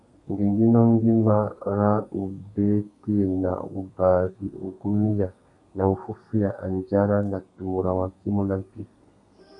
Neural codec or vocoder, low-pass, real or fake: codec, 32 kHz, 1.9 kbps, SNAC; 10.8 kHz; fake